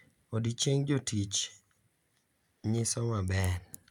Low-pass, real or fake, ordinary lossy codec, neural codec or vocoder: 19.8 kHz; real; none; none